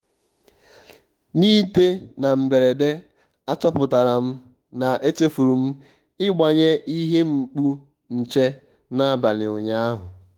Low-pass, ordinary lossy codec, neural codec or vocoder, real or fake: 19.8 kHz; Opus, 24 kbps; autoencoder, 48 kHz, 32 numbers a frame, DAC-VAE, trained on Japanese speech; fake